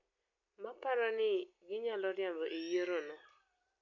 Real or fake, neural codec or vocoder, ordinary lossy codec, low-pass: real; none; none; 7.2 kHz